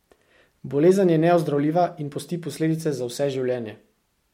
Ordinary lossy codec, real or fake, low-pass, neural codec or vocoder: MP3, 64 kbps; real; 19.8 kHz; none